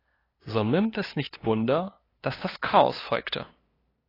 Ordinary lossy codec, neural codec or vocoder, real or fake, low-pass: AAC, 24 kbps; codec, 16 kHz, 2 kbps, FunCodec, trained on LibriTTS, 25 frames a second; fake; 5.4 kHz